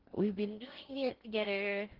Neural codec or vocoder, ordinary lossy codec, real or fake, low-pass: codec, 16 kHz in and 24 kHz out, 0.6 kbps, FocalCodec, streaming, 4096 codes; Opus, 16 kbps; fake; 5.4 kHz